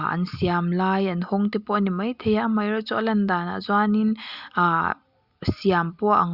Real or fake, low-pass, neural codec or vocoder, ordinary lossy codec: real; 5.4 kHz; none; Opus, 64 kbps